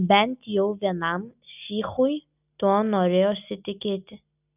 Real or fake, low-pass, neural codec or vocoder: real; 3.6 kHz; none